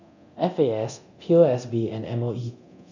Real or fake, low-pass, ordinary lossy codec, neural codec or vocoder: fake; 7.2 kHz; none; codec, 24 kHz, 0.9 kbps, DualCodec